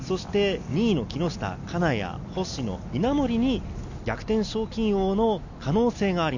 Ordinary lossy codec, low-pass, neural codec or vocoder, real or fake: none; 7.2 kHz; none; real